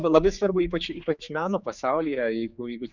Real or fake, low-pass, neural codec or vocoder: fake; 7.2 kHz; codec, 16 kHz, 4 kbps, X-Codec, HuBERT features, trained on general audio